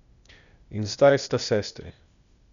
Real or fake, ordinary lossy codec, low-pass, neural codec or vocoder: fake; none; 7.2 kHz; codec, 16 kHz, 0.8 kbps, ZipCodec